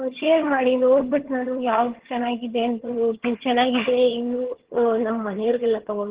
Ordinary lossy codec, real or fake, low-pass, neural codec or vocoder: Opus, 16 kbps; fake; 3.6 kHz; vocoder, 22.05 kHz, 80 mel bands, HiFi-GAN